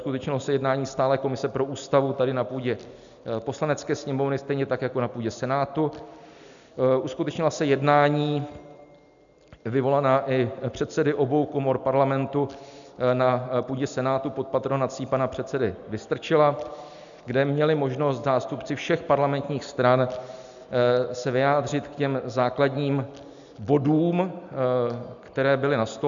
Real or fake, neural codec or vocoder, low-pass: real; none; 7.2 kHz